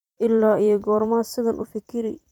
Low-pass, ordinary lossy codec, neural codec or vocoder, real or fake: 19.8 kHz; MP3, 96 kbps; vocoder, 44.1 kHz, 128 mel bands every 256 samples, BigVGAN v2; fake